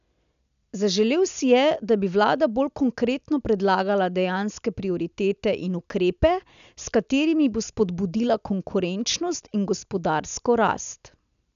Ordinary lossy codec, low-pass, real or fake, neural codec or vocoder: none; 7.2 kHz; real; none